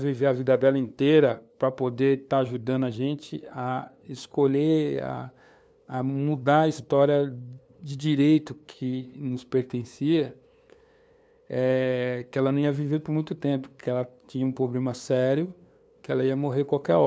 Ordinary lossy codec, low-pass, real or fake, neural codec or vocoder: none; none; fake; codec, 16 kHz, 2 kbps, FunCodec, trained on LibriTTS, 25 frames a second